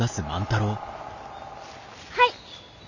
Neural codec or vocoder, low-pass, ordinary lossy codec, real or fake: none; 7.2 kHz; none; real